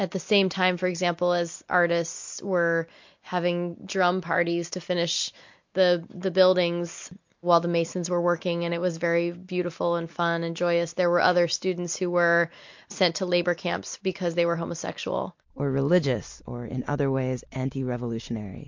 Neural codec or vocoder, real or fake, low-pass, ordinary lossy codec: none; real; 7.2 kHz; MP3, 48 kbps